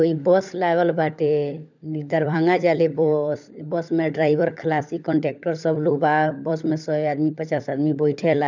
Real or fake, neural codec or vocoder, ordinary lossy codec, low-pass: fake; codec, 16 kHz, 16 kbps, FunCodec, trained on LibriTTS, 50 frames a second; none; 7.2 kHz